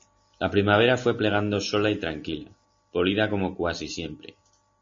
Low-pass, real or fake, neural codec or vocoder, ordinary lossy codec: 7.2 kHz; real; none; MP3, 32 kbps